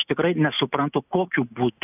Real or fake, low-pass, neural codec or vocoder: real; 3.6 kHz; none